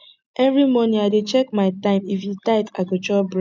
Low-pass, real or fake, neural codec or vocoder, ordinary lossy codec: none; real; none; none